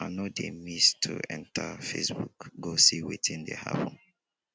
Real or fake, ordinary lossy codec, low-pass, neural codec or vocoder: real; none; none; none